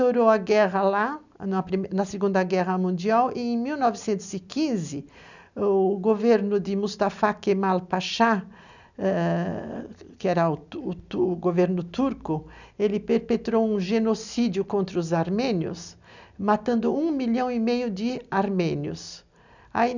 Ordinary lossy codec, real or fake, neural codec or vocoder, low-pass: none; real; none; 7.2 kHz